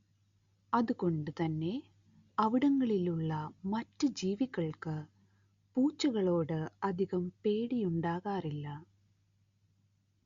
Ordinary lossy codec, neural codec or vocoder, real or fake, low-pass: Opus, 64 kbps; none; real; 7.2 kHz